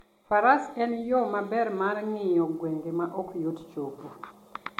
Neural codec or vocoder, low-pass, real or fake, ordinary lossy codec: none; 19.8 kHz; real; MP3, 64 kbps